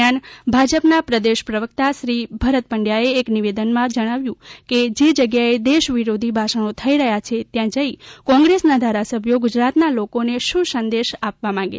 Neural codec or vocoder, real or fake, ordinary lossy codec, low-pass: none; real; none; none